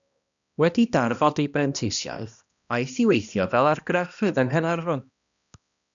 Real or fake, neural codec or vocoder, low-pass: fake; codec, 16 kHz, 1 kbps, X-Codec, HuBERT features, trained on balanced general audio; 7.2 kHz